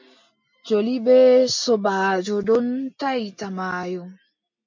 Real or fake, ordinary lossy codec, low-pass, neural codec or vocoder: real; MP3, 48 kbps; 7.2 kHz; none